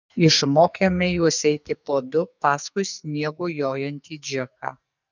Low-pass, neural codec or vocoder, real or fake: 7.2 kHz; codec, 44.1 kHz, 2.6 kbps, SNAC; fake